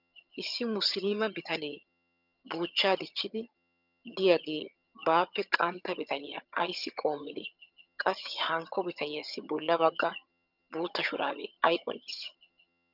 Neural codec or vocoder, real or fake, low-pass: vocoder, 22.05 kHz, 80 mel bands, HiFi-GAN; fake; 5.4 kHz